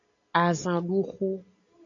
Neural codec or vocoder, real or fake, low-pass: none; real; 7.2 kHz